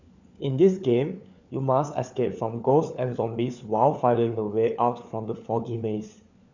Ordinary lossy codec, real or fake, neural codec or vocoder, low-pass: none; fake; codec, 16 kHz, 4 kbps, FunCodec, trained on LibriTTS, 50 frames a second; 7.2 kHz